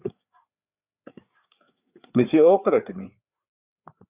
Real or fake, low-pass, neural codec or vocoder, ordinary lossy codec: fake; 3.6 kHz; codec, 16 kHz, 4 kbps, FreqCodec, larger model; Opus, 64 kbps